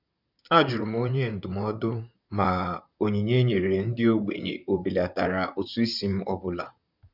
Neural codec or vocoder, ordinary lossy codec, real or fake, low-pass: vocoder, 44.1 kHz, 128 mel bands, Pupu-Vocoder; none; fake; 5.4 kHz